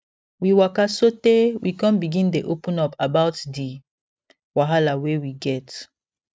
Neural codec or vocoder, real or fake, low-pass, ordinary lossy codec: none; real; none; none